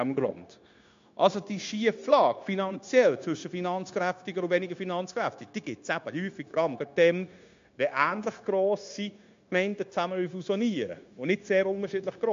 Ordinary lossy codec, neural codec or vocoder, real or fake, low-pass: MP3, 48 kbps; codec, 16 kHz, 0.9 kbps, LongCat-Audio-Codec; fake; 7.2 kHz